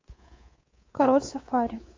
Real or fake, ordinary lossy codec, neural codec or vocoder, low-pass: fake; AAC, 32 kbps; codec, 24 kHz, 3.1 kbps, DualCodec; 7.2 kHz